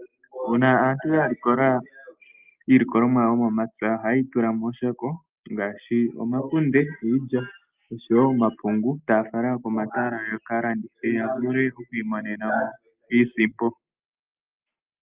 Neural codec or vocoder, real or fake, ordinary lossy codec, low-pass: none; real; Opus, 24 kbps; 3.6 kHz